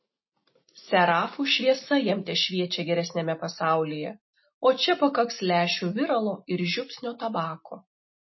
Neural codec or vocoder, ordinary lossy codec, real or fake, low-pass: none; MP3, 24 kbps; real; 7.2 kHz